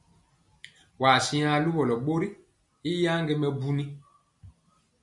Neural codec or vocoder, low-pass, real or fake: none; 10.8 kHz; real